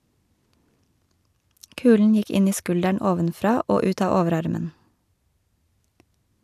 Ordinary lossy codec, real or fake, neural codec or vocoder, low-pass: none; real; none; 14.4 kHz